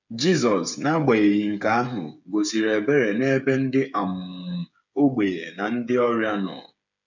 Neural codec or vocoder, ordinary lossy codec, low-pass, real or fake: codec, 16 kHz, 8 kbps, FreqCodec, smaller model; none; 7.2 kHz; fake